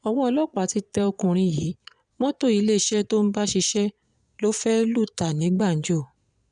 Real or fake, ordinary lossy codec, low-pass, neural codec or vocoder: fake; none; 9.9 kHz; vocoder, 22.05 kHz, 80 mel bands, Vocos